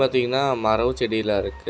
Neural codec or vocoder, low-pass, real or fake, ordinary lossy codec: none; none; real; none